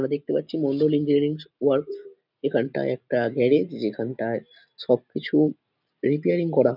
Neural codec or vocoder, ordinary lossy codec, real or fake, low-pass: none; none; real; 5.4 kHz